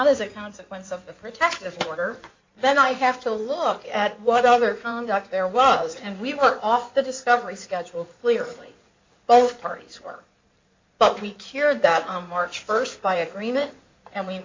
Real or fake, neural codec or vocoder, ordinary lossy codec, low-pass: fake; codec, 16 kHz in and 24 kHz out, 2.2 kbps, FireRedTTS-2 codec; MP3, 64 kbps; 7.2 kHz